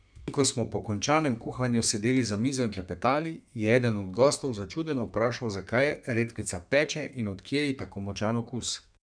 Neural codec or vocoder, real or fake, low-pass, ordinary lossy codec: codec, 32 kHz, 1.9 kbps, SNAC; fake; 9.9 kHz; none